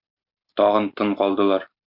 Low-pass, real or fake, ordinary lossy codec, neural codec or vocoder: 5.4 kHz; real; MP3, 48 kbps; none